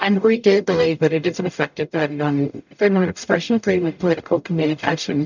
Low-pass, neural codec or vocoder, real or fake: 7.2 kHz; codec, 44.1 kHz, 0.9 kbps, DAC; fake